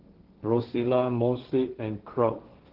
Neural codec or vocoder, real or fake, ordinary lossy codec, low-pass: codec, 16 kHz, 1.1 kbps, Voila-Tokenizer; fake; Opus, 16 kbps; 5.4 kHz